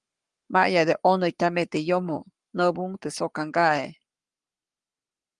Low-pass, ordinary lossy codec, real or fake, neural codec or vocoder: 10.8 kHz; Opus, 32 kbps; fake; codec, 44.1 kHz, 7.8 kbps, Pupu-Codec